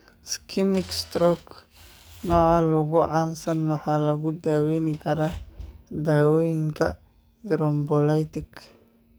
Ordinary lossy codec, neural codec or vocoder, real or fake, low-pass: none; codec, 44.1 kHz, 2.6 kbps, SNAC; fake; none